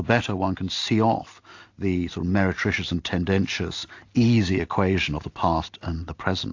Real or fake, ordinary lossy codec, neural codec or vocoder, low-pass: real; AAC, 48 kbps; none; 7.2 kHz